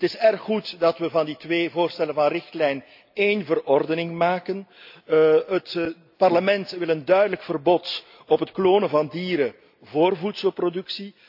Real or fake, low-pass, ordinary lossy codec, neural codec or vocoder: real; 5.4 kHz; AAC, 48 kbps; none